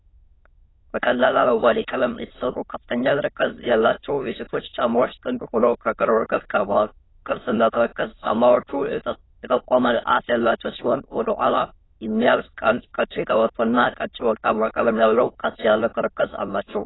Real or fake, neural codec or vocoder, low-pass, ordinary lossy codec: fake; autoencoder, 22.05 kHz, a latent of 192 numbers a frame, VITS, trained on many speakers; 7.2 kHz; AAC, 16 kbps